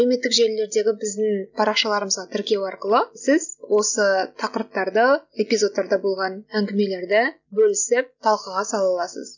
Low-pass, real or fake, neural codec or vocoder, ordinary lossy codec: 7.2 kHz; real; none; none